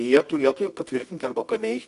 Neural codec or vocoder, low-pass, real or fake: codec, 24 kHz, 0.9 kbps, WavTokenizer, medium music audio release; 10.8 kHz; fake